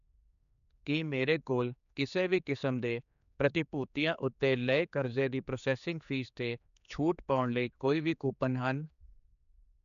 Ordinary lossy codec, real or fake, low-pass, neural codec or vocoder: none; fake; 7.2 kHz; codec, 16 kHz, 4 kbps, X-Codec, HuBERT features, trained on general audio